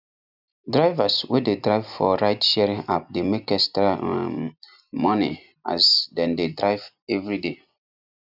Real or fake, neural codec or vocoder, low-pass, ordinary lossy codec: real; none; 5.4 kHz; none